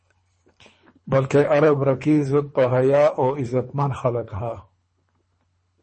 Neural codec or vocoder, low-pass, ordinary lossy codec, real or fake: codec, 24 kHz, 3 kbps, HILCodec; 9.9 kHz; MP3, 32 kbps; fake